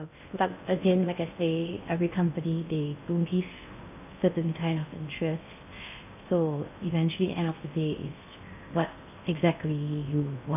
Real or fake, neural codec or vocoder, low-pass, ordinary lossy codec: fake; codec, 16 kHz in and 24 kHz out, 0.6 kbps, FocalCodec, streaming, 2048 codes; 3.6 kHz; none